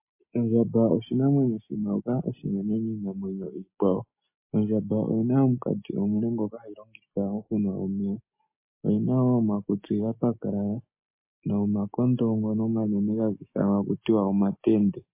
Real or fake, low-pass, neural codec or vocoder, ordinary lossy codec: real; 3.6 kHz; none; MP3, 24 kbps